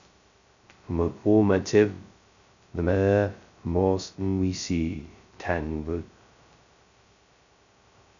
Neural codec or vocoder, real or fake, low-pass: codec, 16 kHz, 0.2 kbps, FocalCodec; fake; 7.2 kHz